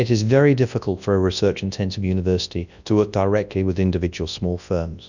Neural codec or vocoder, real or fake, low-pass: codec, 24 kHz, 0.9 kbps, WavTokenizer, large speech release; fake; 7.2 kHz